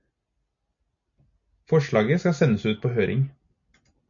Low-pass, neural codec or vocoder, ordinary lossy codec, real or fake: 7.2 kHz; none; MP3, 64 kbps; real